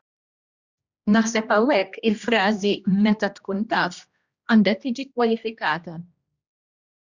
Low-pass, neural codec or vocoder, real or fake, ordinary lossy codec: 7.2 kHz; codec, 16 kHz, 1 kbps, X-Codec, HuBERT features, trained on general audio; fake; Opus, 64 kbps